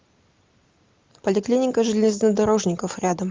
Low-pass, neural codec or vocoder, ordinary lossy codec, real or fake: 7.2 kHz; none; Opus, 32 kbps; real